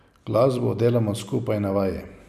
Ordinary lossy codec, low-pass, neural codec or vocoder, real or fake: none; 14.4 kHz; none; real